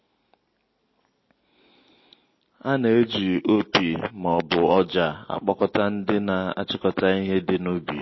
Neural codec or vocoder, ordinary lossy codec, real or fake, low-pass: none; MP3, 24 kbps; real; 7.2 kHz